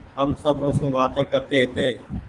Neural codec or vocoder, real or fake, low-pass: codec, 24 kHz, 3 kbps, HILCodec; fake; 10.8 kHz